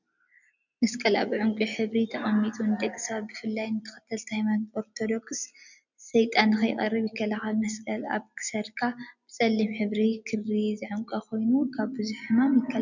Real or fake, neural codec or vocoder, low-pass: real; none; 7.2 kHz